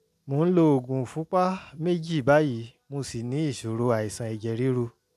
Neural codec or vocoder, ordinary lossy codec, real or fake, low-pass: none; none; real; 14.4 kHz